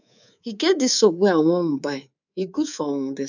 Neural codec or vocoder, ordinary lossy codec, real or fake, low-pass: codec, 24 kHz, 3.1 kbps, DualCodec; none; fake; 7.2 kHz